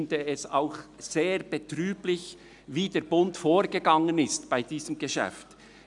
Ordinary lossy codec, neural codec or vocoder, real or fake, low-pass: none; none; real; 10.8 kHz